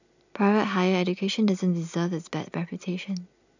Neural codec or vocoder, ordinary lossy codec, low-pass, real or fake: none; none; 7.2 kHz; real